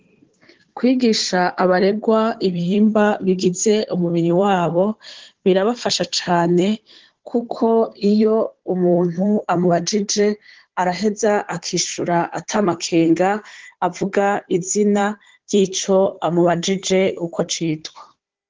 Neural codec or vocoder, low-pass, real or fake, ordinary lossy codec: codec, 16 kHz, 4 kbps, FunCodec, trained on Chinese and English, 50 frames a second; 7.2 kHz; fake; Opus, 16 kbps